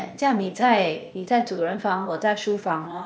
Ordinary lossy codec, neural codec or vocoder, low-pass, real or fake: none; codec, 16 kHz, 0.8 kbps, ZipCodec; none; fake